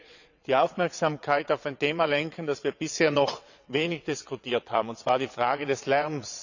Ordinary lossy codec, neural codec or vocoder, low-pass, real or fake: none; vocoder, 22.05 kHz, 80 mel bands, WaveNeXt; 7.2 kHz; fake